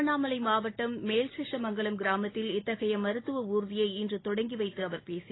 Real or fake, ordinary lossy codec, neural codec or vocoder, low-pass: real; AAC, 16 kbps; none; 7.2 kHz